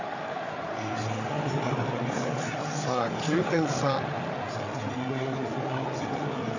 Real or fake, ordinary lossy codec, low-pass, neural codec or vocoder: fake; none; 7.2 kHz; codec, 16 kHz, 8 kbps, FreqCodec, larger model